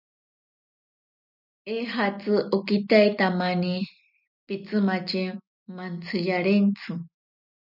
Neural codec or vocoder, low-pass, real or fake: none; 5.4 kHz; real